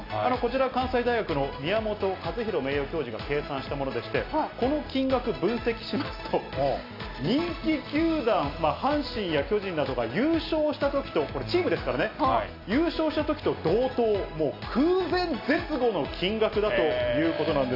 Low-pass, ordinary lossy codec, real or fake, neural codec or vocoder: 5.4 kHz; AAC, 32 kbps; real; none